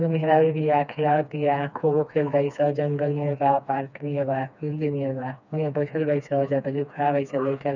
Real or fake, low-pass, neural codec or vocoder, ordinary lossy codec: fake; 7.2 kHz; codec, 16 kHz, 2 kbps, FreqCodec, smaller model; none